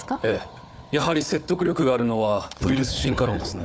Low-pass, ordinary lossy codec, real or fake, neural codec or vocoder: none; none; fake; codec, 16 kHz, 4 kbps, FunCodec, trained on Chinese and English, 50 frames a second